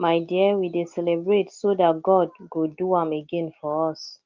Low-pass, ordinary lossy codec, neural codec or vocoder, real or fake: 7.2 kHz; Opus, 32 kbps; none; real